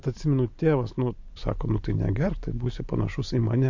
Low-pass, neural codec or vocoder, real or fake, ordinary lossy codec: 7.2 kHz; none; real; MP3, 48 kbps